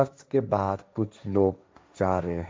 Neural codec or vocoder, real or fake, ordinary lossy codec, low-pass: codec, 16 kHz, 1.1 kbps, Voila-Tokenizer; fake; none; none